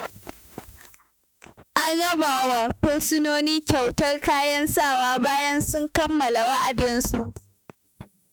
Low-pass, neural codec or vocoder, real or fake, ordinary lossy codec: none; autoencoder, 48 kHz, 32 numbers a frame, DAC-VAE, trained on Japanese speech; fake; none